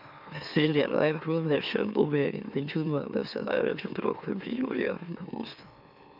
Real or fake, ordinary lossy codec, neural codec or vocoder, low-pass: fake; none; autoencoder, 44.1 kHz, a latent of 192 numbers a frame, MeloTTS; 5.4 kHz